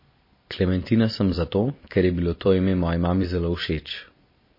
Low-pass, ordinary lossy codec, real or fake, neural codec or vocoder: 5.4 kHz; MP3, 24 kbps; fake; vocoder, 44.1 kHz, 128 mel bands every 256 samples, BigVGAN v2